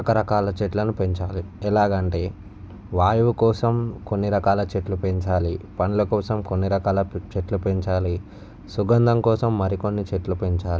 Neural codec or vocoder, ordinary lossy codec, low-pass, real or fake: none; none; none; real